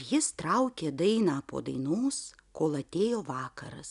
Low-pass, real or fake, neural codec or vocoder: 10.8 kHz; real; none